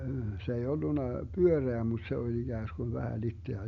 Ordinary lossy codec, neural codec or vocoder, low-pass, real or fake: none; none; 7.2 kHz; real